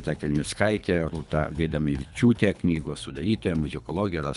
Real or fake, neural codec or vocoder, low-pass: fake; codec, 24 kHz, 3 kbps, HILCodec; 10.8 kHz